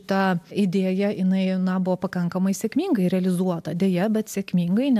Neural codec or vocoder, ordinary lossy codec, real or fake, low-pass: none; AAC, 96 kbps; real; 14.4 kHz